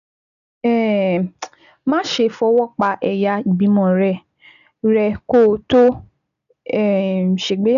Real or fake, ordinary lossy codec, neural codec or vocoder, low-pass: real; none; none; 7.2 kHz